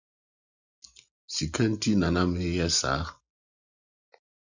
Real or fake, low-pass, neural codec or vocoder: real; 7.2 kHz; none